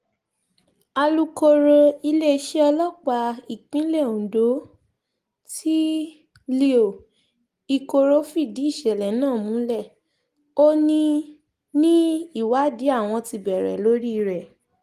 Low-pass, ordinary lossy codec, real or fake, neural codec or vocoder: 14.4 kHz; Opus, 24 kbps; real; none